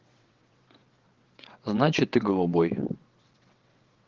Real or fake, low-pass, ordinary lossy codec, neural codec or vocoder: fake; 7.2 kHz; Opus, 16 kbps; vocoder, 22.05 kHz, 80 mel bands, WaveNeXt